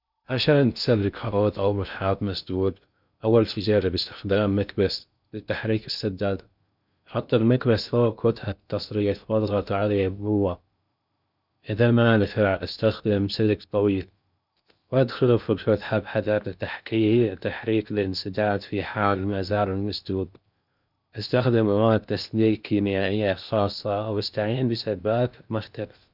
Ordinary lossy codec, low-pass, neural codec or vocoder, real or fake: none; 5.4 kHz; codec, 16 kHz in and 24 kHz out, 0.6 kbps, FocalCodec, streaming, 2048 codes; fake